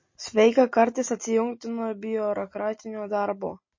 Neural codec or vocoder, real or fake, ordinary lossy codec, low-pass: none; real; MP3, 32 kbps; 7.2 kHz